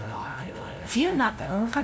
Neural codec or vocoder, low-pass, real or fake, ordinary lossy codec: codec, 16 kHz, 0.5 kbps, FunCodec, trained on LibriTTS, 25 frames a second; none; fake; none